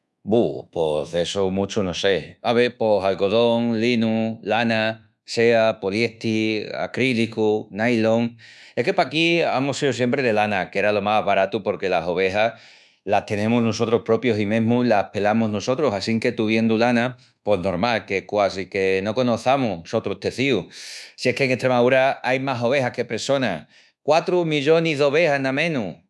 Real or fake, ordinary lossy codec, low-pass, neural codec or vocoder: fake; none; none; codec, 24 kHz, 1.2 kbps, DualCodec